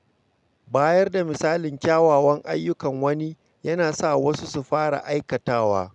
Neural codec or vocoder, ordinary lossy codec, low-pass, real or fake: none; none; 10.8 kHz; real